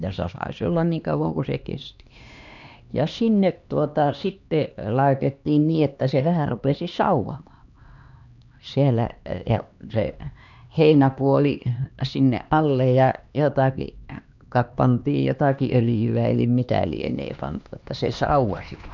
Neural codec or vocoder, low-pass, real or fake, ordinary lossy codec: codec, 16 kHz, 2 kbps, X-Codec, HuBERT features, trained on LibriSpeech; 7.2 kHz; fake; none